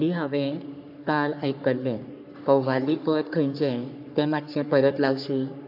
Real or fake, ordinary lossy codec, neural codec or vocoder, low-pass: fake; AAC, 48 kbps; codec, 44.1 kHz, 3.4 kbps, Pupu-Codec; 5.4 kHz